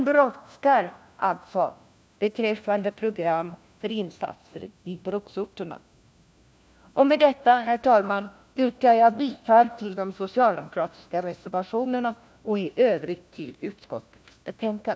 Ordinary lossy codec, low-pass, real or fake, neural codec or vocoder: none; none; fake; codec, 16 kHz, 1 kbps, FunCodec, trained on LibriTTS, 50 frames a second